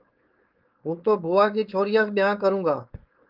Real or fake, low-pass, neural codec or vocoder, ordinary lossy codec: fake; 5.4 kHz; codec, 16 kHz, 4.8 kbps, FACodec; Opus, 24 kbps